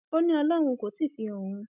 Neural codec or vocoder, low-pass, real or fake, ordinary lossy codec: none; 3.6 kHz; real; none